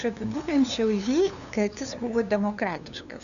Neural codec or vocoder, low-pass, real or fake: codec, 16 kHz, 2 kbps, FunCodec, trained on LibriTTS, 25 frames a second; 7.2 kHz; fake